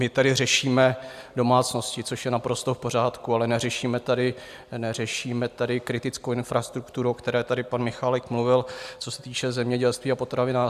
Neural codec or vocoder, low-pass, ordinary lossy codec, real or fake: none; 14.4 kHz; AAC, 96 kbps; real